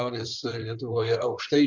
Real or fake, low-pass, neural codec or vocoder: fake; 7.2 kHz; codec, 16 kHz in and 24 kHz out, 2.2 kbps, FireRedTTS-2 codec